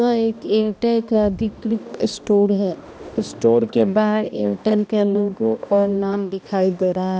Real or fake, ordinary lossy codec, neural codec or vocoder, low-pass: fake; none; codec, 16 kHz, 1 kbps, X-Codec, HuBERT features, trained on balanced general audio; none